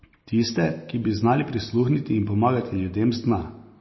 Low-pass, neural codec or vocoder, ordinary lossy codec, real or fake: 7.2 kHz; none; MP3, 24 kbps; real